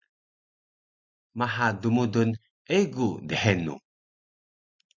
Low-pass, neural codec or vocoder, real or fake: 7.2 kHz; none; real